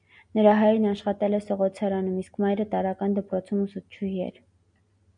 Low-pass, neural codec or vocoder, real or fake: 9.9 kHz; none; real